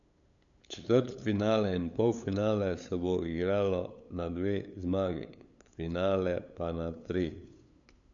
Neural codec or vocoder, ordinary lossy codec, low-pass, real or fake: codec, 16 kHz, 8 kbps, FunCodec, trained on LibriTTS, 25 frames a second; none; 7.2 kHz; fake